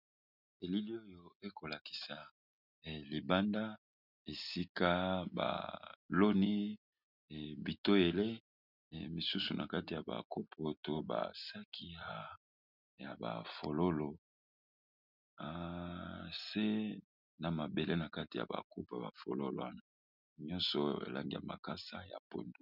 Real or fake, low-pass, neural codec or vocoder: real; 5.4 kHz; none